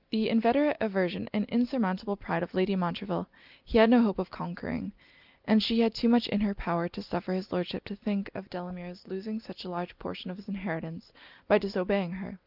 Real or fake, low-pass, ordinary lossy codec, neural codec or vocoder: real; 5.4 kHz; Opus, 24 kbps; none